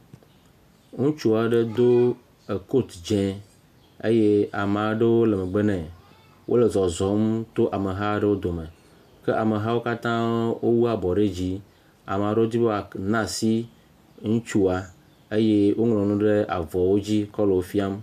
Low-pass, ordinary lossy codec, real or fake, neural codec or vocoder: 14.4 kHz; MP3, 96 kbps; real; none